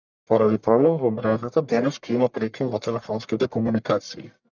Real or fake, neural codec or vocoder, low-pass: fake; codec, 44.1 kHz, 1.7 kbps, Pupu-Codec; 7.2 kHz